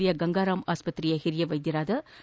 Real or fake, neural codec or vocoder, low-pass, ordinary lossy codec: real; none; none; none